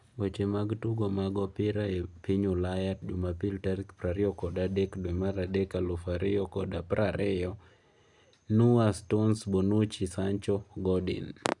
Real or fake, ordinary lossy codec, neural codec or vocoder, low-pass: real; none; none; 10.8 kHz